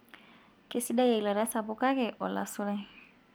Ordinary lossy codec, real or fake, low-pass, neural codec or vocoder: none; real; none; none